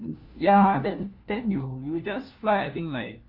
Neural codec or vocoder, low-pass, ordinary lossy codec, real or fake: codec, 16 kHz, 1 kbps, FunCodec, trained on LibriTTS, 50 frames a second; 5.4 kHz; Opus, 32 kbps; fake